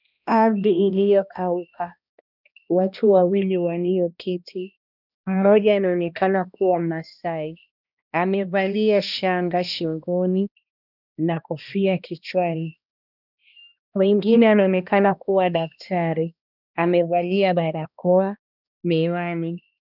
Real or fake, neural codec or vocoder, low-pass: fake; codec, 16 kHz, 1 kbps, X-Codec, HuBERT features, trained on balanced general audio; 5.4 kHz